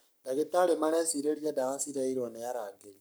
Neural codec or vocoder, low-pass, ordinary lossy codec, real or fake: codec, 44.1 kHz, 7.8 kbps, Pupu-Codec; none; none; fake